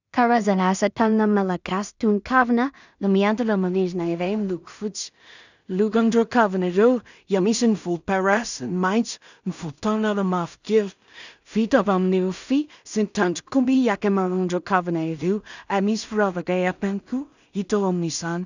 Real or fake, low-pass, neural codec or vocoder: fake; 7.2 kHz; codec, 16 kHz in and 24 kHz out, 0.4 kbps, LongCat-Audio-Codec, two codebook decoder